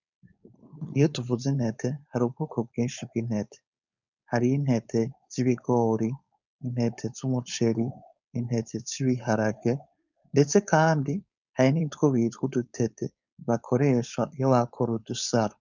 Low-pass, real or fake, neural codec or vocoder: 7.2 kHz; fake; codec, 16 kHz, 4.8 kbps, FACodec